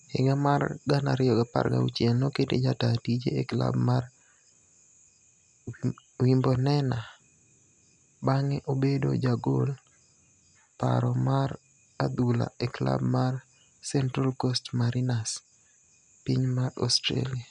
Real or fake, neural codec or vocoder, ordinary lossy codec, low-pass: real; none; none; 9.9 kHz